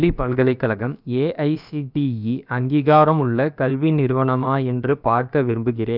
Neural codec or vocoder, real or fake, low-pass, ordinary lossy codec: codec, 16 kHz, about 1 kbps, DyCAST, with the encoder's durations; fake; 5.4 kHz; none